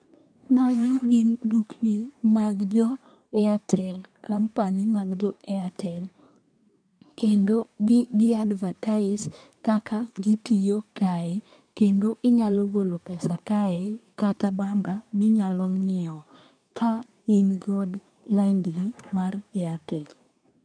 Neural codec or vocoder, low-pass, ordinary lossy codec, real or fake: codec, 24 kHz, 1 kbps, SNAC; 9.9 kHz; none; fake